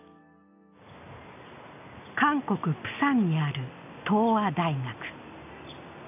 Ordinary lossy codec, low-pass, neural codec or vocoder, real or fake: none; 3.6 kHz; none; real